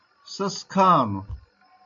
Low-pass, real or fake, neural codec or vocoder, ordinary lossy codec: 7.2 kHz; real; none; AAC, 64 kbps